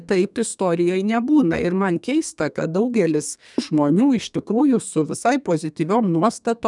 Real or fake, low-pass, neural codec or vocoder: fake; 10.8 kHz; codec, 32 kHz, 1.9 kbps, SNAC